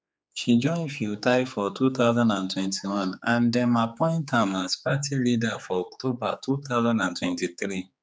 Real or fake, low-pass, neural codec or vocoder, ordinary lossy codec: fake; none; codec, 16 kHz, 4 kbps, X-Codec, HuBERT features, trained on general audio; none